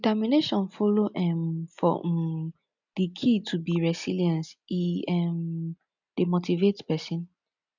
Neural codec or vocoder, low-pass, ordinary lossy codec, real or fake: none; 7.2 kHz; none; real